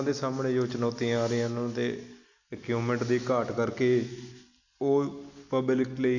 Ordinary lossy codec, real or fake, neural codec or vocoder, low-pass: none; real; none; 7.2 kHz